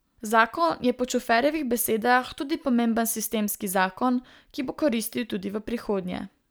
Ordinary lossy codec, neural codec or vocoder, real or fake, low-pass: none; none; real; none